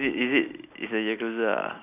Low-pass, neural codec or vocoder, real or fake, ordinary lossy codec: 3.6 kHz; none; real; none